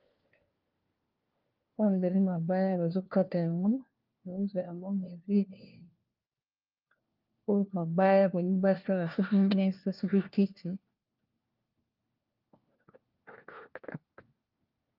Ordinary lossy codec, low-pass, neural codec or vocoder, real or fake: Opus, 24 kbps; 5.4 kHz; codec, 16 kHz, 1 kbps, FunCodec, trained on LibriTTS, 50 frames a second; fake